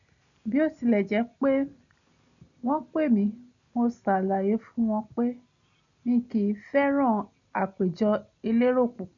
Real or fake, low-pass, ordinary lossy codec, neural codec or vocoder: real; 7.2 kHz; none; none